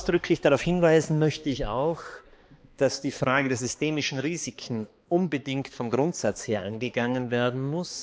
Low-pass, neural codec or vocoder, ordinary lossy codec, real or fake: none; codec, 16 kHz, 2 kbps, X-Codec, HuBERT features, trained on balanced general audio; none; fake